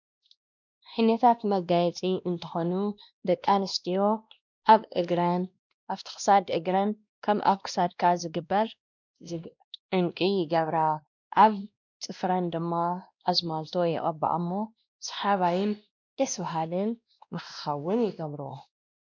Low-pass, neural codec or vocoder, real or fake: 7.2 kHz; codec, 16 kHz, 1 kbps, X-Codec, WavLM features, trained on Multilingual LibriSpeech; fake